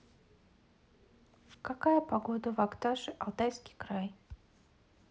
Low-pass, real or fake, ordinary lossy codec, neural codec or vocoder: none; real; none; none